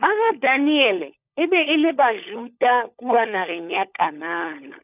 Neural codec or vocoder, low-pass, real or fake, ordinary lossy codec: codec, 16 kHz, 16 kbps, FunCodec, trained on LibriTTS, 50 frames a second; 3.6 kHz; fake; none